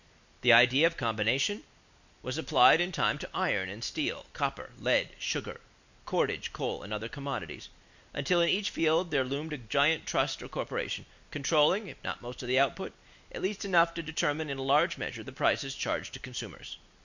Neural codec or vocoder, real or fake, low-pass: none; real; 7.2 kHz